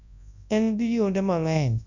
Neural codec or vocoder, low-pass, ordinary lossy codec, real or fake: codec, 24 kHz, 0.9 kbps, WavTokenizer, large speech release; 7.2 kHz; none; fake